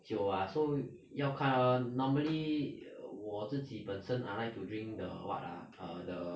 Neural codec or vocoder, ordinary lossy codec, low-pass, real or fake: none; none; none; real